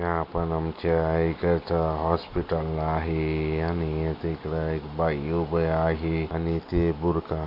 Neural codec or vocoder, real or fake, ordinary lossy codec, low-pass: none; real; Opus, 64 kbps; 5.4 kHz